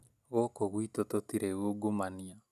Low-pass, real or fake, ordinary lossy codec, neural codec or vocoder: 14.4 kHz; real; none; none